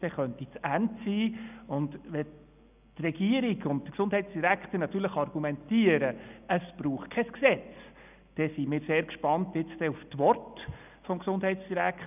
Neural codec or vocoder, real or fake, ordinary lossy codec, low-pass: none; real; none; 3.6 kHz